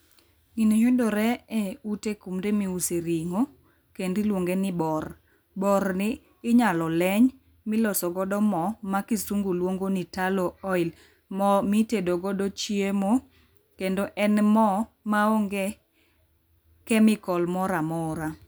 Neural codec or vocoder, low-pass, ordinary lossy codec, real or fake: none; none; none; real